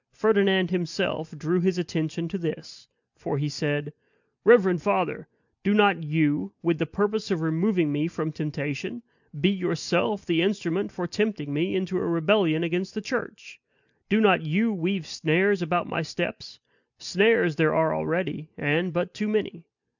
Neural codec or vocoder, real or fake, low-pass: none; real; 7.2 kHz